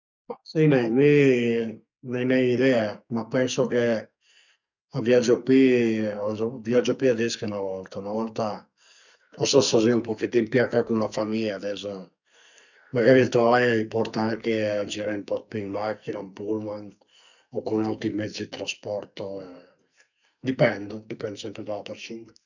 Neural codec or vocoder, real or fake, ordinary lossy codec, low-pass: codec, 44.1 kHz, 2.6 kbps, SNAC; fake; none; 7.2 kHz